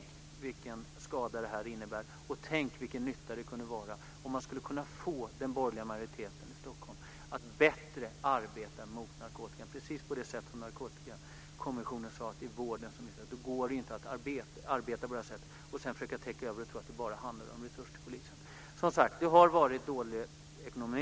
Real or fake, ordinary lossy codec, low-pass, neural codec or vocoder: real; none; none; none